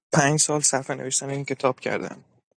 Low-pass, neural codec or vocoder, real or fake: 9.9 kHz; none; real